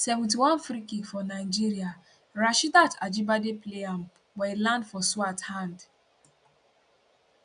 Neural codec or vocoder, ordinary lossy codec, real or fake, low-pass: none; none; real; 9.9 kHz